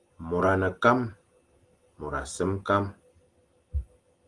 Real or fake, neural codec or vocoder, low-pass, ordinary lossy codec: real; none; 10.8 kHz; Opus, 24 kbps